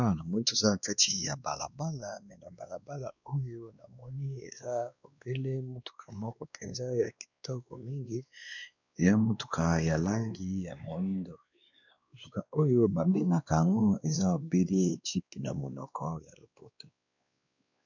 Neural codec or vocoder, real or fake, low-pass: codec, 16 kHz, 2 kbps, X-Codec, WavLM features, trained on Multilingual LibriSpeech; fake; 7.2 kHz